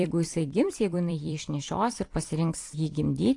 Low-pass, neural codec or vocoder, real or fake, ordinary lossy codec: 10.8 kHz; vocoder, 44.1 kHz, 128 mel bands every 256 samples, BigVGAN v2; fake; AAC, 48 kbps